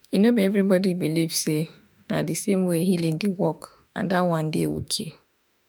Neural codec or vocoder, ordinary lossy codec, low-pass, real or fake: autoencoder, 48 kHz, 32 numbers a frame, DAC-VAE, trained on Japanese speech; none; none; fake